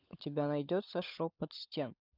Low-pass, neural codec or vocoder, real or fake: 5.4 kHz; codec, 16 kHz, 16 kbps, FunCodec, trained on LibriTTS, 50 frames a second; fake